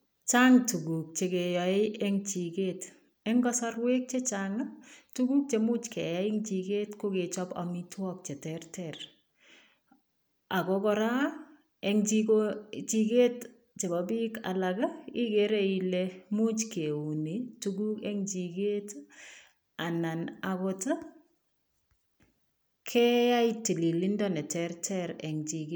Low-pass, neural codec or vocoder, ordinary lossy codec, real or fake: none; none; none; real